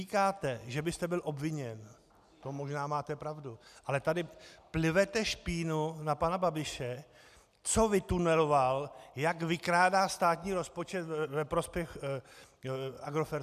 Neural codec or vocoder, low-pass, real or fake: none; 14.4 kHz; real